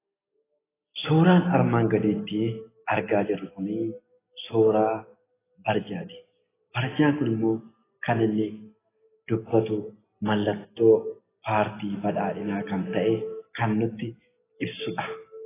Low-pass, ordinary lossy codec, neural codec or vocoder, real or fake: 3.6 kHz; AAC, 16 kbps; none; real